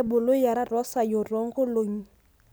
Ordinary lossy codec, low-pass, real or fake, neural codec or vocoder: none; none; real; none